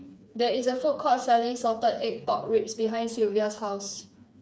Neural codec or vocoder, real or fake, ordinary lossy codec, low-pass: codec, 16 kHz, 4 kbps, FreqCodec, smaller model; fake; none; none